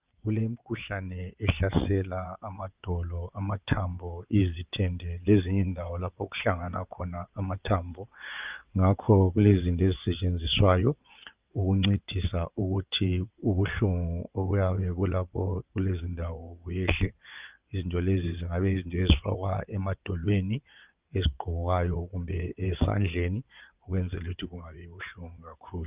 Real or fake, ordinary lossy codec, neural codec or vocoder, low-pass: fake; Opus, 32 kbps; vocoder, 22.05 kHz, 80 mel bands, WaveNeXt; 3.6 kHz